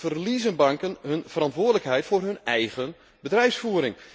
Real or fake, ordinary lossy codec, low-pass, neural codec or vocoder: real; none; none; none